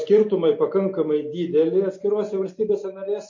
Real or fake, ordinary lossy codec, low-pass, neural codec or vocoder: real; MP3, 32 kbps; 7.2 kHz; none